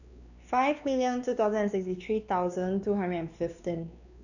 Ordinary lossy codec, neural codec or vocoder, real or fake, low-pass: none; codec, 16 kHz, 2 kbps, X-Codec, WavLM features, trained on Multilingual LibriSpeech; fake; 7.2 kHz